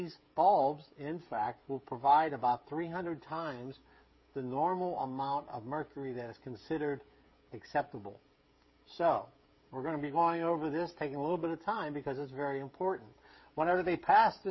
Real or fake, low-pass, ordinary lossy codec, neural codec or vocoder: fake; 7.2 kHz; MP3, 24 kbps; codec, 16 kHz, 16 kbps, FreqCodec, smaller model